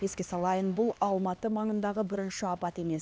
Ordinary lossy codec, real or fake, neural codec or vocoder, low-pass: none; fake; codec, 16 kHz, 2 kbps, X-Codec, HuBERT features, trained on LibriSpeech; none